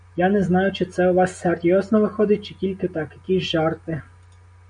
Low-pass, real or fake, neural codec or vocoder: 9.9 kHz; real; none